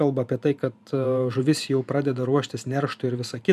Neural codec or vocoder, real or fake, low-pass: vocoder, 44.1 kHz, 128 mel bands every 512 samples, BigVGAN v2; fake; 14.4 kHz